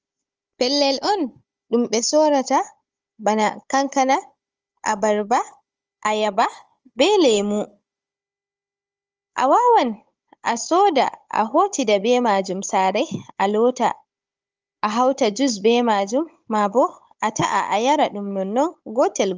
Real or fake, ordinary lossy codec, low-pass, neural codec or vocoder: fake; Opus, 24 kbps; 7.2 kHz; codec, 16 kHz, 16 kbps, FunCodec, trained on Chinese and English, 50 frames a second